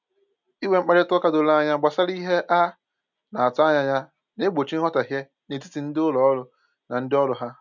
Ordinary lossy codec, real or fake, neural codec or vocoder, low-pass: none; real; none; 7.2 kHz